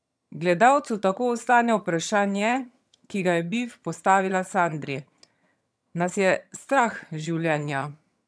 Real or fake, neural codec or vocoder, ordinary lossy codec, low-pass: fake; vocoder, 22.05 kHz, 80 mel bands, HiFi-GAN; none; none